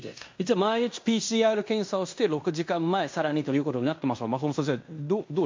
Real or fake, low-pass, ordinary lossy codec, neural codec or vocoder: fake; 7.2 kHz; MP3, 48 kbps; codec, 16 kHz in and 24 kHz out, 0.9 kbps, LongCat-Audio-Codec, fine tuned four codebook decoder